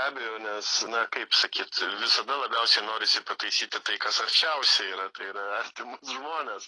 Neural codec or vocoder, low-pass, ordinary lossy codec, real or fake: none; 10.8 kHz; AAC, 32 kbps; real